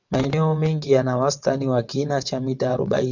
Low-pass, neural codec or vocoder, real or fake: 7.2 kHz; vocoder, 22.05 kHz, 80 mel bands, WaveNeXt; fake